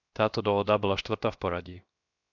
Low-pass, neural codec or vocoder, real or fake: 7.2 kHz; codec, 16 kHz, 0.7 kbps, FocalCodec; fake